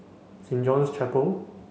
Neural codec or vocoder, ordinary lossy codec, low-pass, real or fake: none; none; none; real